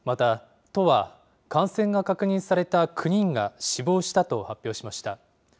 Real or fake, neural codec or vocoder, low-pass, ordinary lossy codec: real; none; none; none